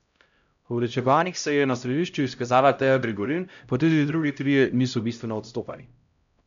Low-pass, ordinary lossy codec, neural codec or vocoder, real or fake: 7.2 kHz; none; codec, 16 kHz, 0.5 kbps, X-Codec, HuBERT features, trained on LibriSpeech; fake